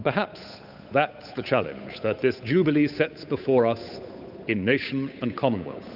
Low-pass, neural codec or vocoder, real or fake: 5.4 kHz; codec, 16 kHz, 8 kbps, FunCodec, trained on Chinese and English, 25 frames a second; fake